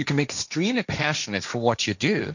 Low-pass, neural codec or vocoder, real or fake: 7.2 kHz; codec, 16 kHz, 1.1 kbps, Voila-Tokenizer; fake